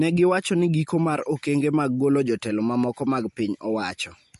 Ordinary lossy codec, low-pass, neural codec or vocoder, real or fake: MP3, 48 kbps; 14.4 kHz; none; real